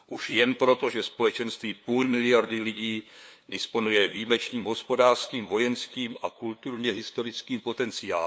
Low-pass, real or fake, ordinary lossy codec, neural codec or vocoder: none; fake; none; codec, 16 kHz, 2 kbps, FunCodec, trained on LibriTTS, 25 frames a second